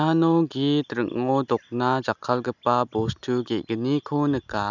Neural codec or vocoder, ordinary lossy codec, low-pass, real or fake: none; none; 7.2 kHz; real